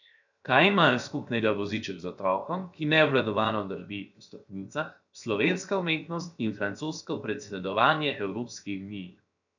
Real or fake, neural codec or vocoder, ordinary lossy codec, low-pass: fake; codec, 16 kHz, 0.7 kbps, FocalCodec; none; 7.2 kHz